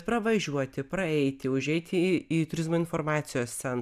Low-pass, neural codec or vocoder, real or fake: 14.4 kHz; none; real